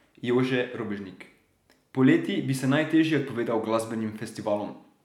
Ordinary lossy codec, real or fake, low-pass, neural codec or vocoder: none; real; 19.8 kHz; none